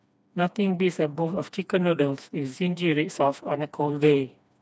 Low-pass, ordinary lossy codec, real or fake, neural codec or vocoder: none; none; fake; codec, 16 kHz, 2 kbps, FreqCodec, smaller model